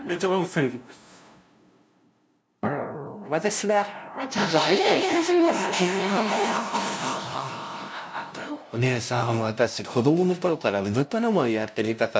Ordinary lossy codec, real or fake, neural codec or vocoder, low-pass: none; fake; codec, 16 kHz, 0.5 kbps, FunCodec, trained on LibriTTS, 25 frames a second; none